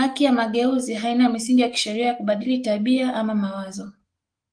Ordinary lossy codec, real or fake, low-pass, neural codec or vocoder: Opus, 24 kbps; fake; 9.9 kHz; autoencoder, 48 kHz, 128 numbers a frame, DAC-VAE, trained on Japanese speech